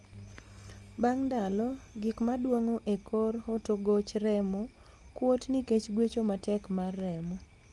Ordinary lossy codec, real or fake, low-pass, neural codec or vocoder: Opus, 32 kbps; real; 10.8 kHz; none